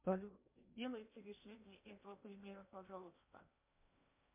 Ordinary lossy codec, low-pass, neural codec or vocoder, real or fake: MP3, 32 kbps; 3.6 kHz; codec, 16 kHz in and 24 kHz out, 0.8 kbps, FocalCodec, streaming, 65536 codes; fake